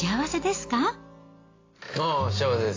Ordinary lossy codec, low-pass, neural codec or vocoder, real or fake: AAC, 32 kbps; 7.2 kHz; none; real